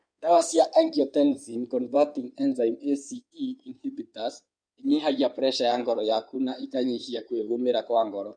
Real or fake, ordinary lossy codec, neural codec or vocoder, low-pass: fake; none; codec, 16 kHz in and 24 kHz out, 2.2 kbps, FireRedTTS-2 codec; 9.9 kHz